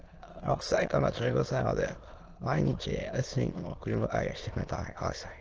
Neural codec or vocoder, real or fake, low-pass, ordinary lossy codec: autoencoder, 22.05 kHz, a latent of 192 numbers a frame, VITS, trained on many speakers; fake; 7.2 kHz; Opus, 16 kbps